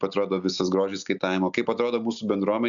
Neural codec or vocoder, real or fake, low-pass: none; real; 7.2 kHz